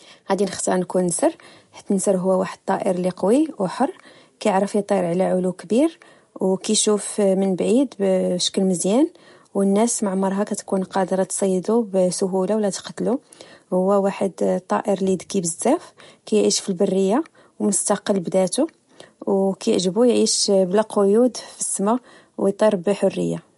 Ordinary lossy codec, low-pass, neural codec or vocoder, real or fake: MP3, 48 kbps; 14.4 kHz; none; real